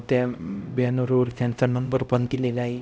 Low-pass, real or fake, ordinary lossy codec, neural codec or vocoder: none; fake; none; codec, 16 kHz, 0.5 kbps, X-Codec, HuBERT features, trained on LibriSpeech